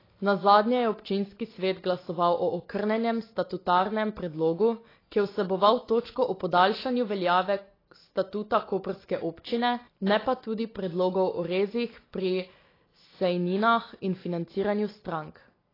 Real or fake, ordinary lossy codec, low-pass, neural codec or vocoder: real; AAC, 24 kbps; 5.4 kHz; none